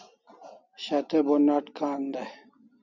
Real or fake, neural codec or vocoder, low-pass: real; none; 7.2 kHz